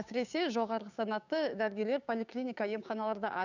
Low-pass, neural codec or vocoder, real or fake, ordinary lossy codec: 7.2 kHz; codec, 16 kHz, 6 kbps, DAC; fake; none